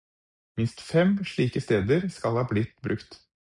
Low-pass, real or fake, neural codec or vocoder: 10.8 kHz; real; none